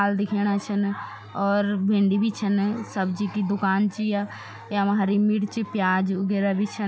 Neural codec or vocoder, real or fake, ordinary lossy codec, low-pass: none; real; none; none